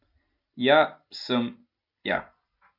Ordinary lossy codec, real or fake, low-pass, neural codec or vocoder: AAC, 48 kbps; real; 5.4 kHz; none